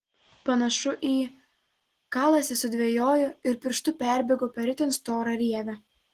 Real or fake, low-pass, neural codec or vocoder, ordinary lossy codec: real; 14.4 kHz; none; Opus, 16 kbps